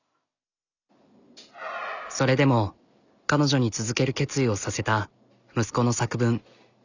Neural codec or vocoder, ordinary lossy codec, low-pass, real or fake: none; none; 7.2 kHz; real